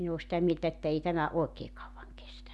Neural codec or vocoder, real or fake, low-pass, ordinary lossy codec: none; real; none; none